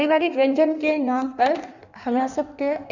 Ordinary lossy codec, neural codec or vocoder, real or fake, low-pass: none; codec, 16 kHz in and 24 kHz out, 1.1 kbps, FireRedTTS-2 codec; fake; 7.2 kHz